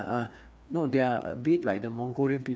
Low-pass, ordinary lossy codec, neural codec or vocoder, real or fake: none; none; codec, 16 kHz, 2 kbps, FreqCodec, larger model; fake